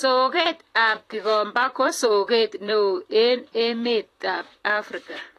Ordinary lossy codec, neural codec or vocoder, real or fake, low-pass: AAC, 48 kbps; vocoder, 44.1 kHz, 128 mel bands, Pupu-Vocoder; fake; 14.4 kHz